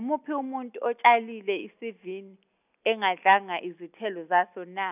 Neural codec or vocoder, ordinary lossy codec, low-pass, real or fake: none; none; 3.6 kHz; real